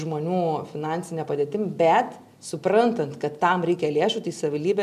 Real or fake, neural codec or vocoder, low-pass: real; none; 14.4 kHz